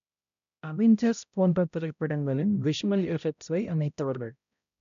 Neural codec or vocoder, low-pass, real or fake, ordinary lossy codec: codec, 16 kHz, 0.5 kbps, X-Codec, HuBERT features, trained on balanced general audio; 7.2 kHz; fake; none